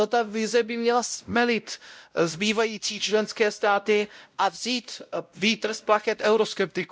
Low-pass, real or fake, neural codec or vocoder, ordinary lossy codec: none; fake; codec, 16 kHz, 0.5 kbps, X-Codec, WavLM features, trained on Multilingual LibriSpeech; none